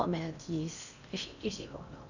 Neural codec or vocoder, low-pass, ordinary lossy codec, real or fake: codec, 16 kHz in and 24 kHz out, 0.6 kbps, FocalCodec, streaming, 4096 codes; 7.2 kHz; none; fake